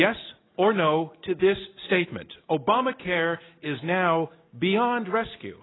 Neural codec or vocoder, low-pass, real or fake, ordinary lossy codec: none; 7.2 kHz; real; AAC, 16 kbps